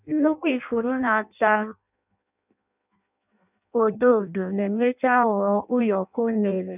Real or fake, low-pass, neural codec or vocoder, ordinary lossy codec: fake; 3.6 kHz; codec, 16 kHz in and 24 kHz out, 0.6 kbps, FireRedTTS-2 codec; none